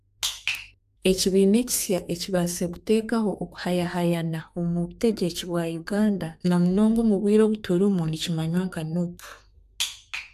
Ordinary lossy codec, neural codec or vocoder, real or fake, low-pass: AAC, 96 kbps; codec, 32 kHz, 1.9 kbps, SNAC; fake; 14.4 kHz